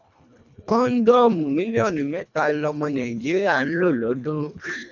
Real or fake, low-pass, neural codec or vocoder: fake; 7.2 kHz; codec, 24 kHz, 1.5 kbps, HILCodec